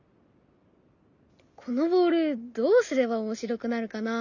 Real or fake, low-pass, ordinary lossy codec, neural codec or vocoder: real; 7.2 kHz; none; none